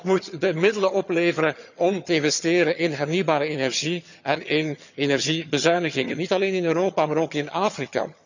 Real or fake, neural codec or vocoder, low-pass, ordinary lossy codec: fake; vocoder, 22.05 kHz, 80 mel bands, HiFi-GAN; 7.2 kHz; none